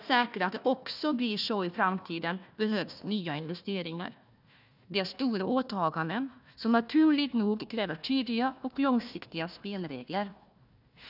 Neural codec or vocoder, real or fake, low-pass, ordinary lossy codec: codec, 16 kHz, 1 kbps, FunCodec, trained on Chinese and English, 50 frames a second; fake; 5.4 kHz; none